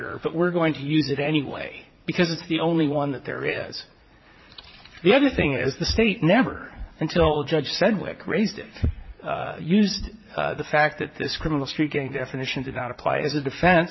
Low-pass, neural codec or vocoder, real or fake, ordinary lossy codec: 7.2 kHz; vocoder, 44.1 kHz, 80 mel bands, Vocos; fake; MP3, 24 kbps